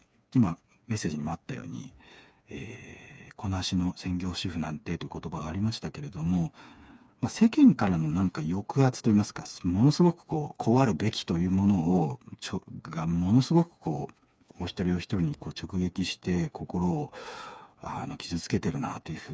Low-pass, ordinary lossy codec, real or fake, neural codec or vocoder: none; none; fake; codec, 16 kHz, 4 kbps, FreqCodec, smaller model